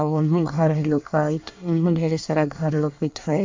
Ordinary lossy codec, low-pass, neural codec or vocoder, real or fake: MP3, 64 kbps; 7.2 kHz; codec, 24 kHz, 1 kbps, SNAC; fake